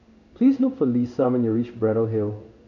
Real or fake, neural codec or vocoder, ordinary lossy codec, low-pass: fake; codec, 16 kHz in and 24 kHz out, 1 kbps, XY-Tokenizer; none; 7.2 kHz